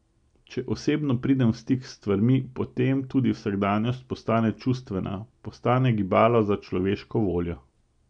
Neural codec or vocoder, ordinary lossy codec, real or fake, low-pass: none; none; real; 9.9 kHz